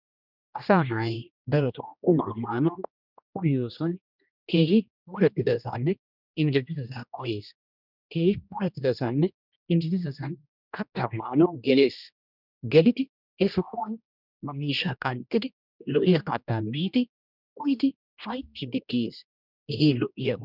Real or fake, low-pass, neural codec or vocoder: fake; 5.4 kHz; codec, 16 kHz, 1 kbps, X-Codec, HuBERT features, trained on general audio